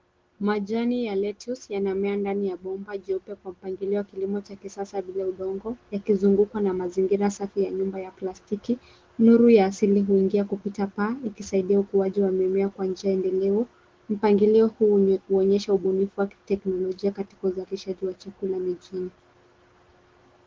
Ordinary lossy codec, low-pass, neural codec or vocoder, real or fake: Opus, 16 kbps; 7.2 kHz; none; real